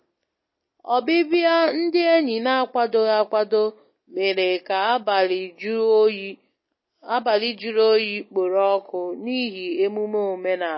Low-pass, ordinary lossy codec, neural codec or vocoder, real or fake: 7.2 kHz; MP3, 24 kbps; none; real